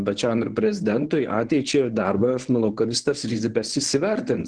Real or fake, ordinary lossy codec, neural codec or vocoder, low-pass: fake; Opus, 16 kbps; codec, 24 kHz, 0.9 kbps, WavTokenizer, medium speech release version 1; 9.9 kHz